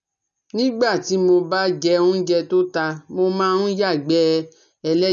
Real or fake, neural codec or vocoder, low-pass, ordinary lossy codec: real; none; 7.2 kHz; none